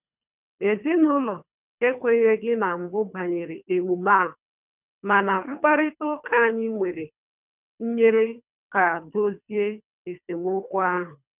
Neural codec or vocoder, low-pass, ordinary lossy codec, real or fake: codec, 24 kHz, 3 kbps, HILCodec; 3.6 kHz; none; fake